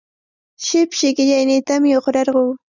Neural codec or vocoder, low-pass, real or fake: none; 7.2 kHz; real